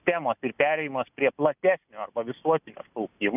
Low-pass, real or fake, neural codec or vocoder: 3.6 kHz; real; none